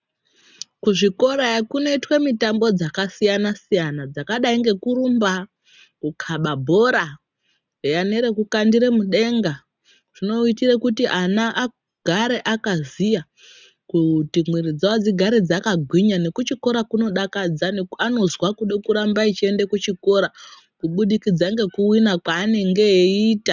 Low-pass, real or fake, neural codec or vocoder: 7.2 kHz; real; none